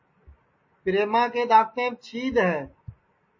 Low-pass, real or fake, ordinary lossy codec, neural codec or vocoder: 7.2 kHz; real; MP3, 32 kbps; none